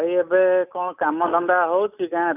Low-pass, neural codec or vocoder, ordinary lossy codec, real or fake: 3.6 kHz; none; none; real